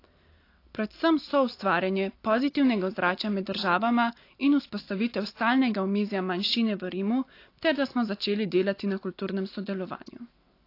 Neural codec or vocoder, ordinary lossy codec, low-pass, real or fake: none; AAC, 32 kbps; 5.4 kHz; real